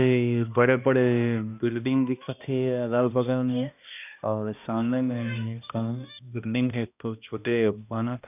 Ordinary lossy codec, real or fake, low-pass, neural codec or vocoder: none; fake; 3.6 kHz; codec, 16 kHz, 1 kbps, X-Codec, HuBERT features, trained on balanced general audio